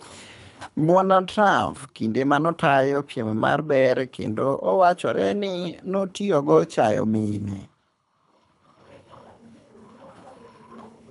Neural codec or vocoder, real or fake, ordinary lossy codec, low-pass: codec, 24 kHz, 3 kbps, HILCodec; fake; none; 10.8 kHz